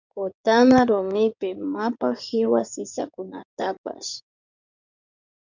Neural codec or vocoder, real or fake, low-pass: codec, 16 kHz in and 24 kHz out, 2.2 kbps, FireRedTTS-2 codec; fake; 7.2 kHz